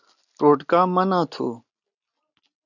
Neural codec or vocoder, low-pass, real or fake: none; 7.2 kHz; real